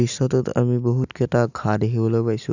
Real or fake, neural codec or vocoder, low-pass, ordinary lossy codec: real; none; 7.2 kHz; none